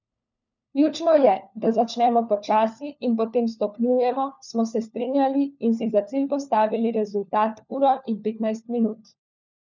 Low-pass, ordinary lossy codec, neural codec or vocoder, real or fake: 7.2 kHz; none; codec, 16 kHz, 4 kbps, FunCodec, trained on LibriTTS, 50 frames a second; fake